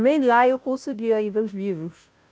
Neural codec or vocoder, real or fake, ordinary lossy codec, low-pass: codec, 16 kHz, 0.5 kbps, FunCodec, trained on Chinese and English, 25 frames a second; fake; none; none